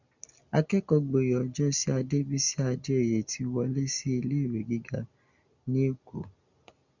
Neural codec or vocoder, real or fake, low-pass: none; real; 7.2 kHz